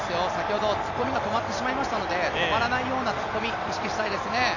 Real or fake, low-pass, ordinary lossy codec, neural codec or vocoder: real; 7.2 kHz; none; none